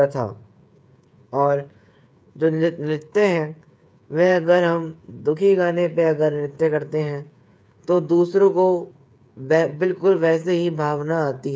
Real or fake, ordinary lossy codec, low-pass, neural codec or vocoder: fake; none; none; codec, 16 kHz, 8 kbps, FreqCodec, smaller model